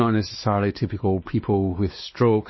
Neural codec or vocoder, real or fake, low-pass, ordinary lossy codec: codec, 16 kHz, 2 kbps, X-Codec, WavLM features, trained on Multilingual LibriSpeech; fake; 7.2 kHz; MP3, 24 kbps